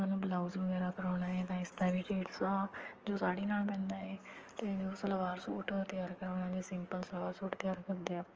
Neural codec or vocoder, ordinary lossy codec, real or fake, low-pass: codec, 44.1 kHz, 7.8 kbps, DAC; Opus, 24 kbps; fake; 7.2 kHz